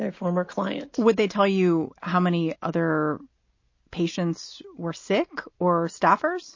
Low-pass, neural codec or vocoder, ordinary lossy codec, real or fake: 7.2 kHz; none; MP3, 32 kbps; real